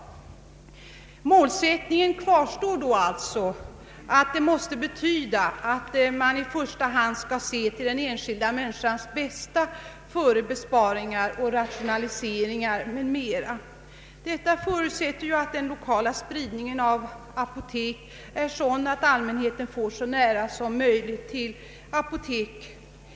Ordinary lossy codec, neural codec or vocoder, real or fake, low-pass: none; none; real; none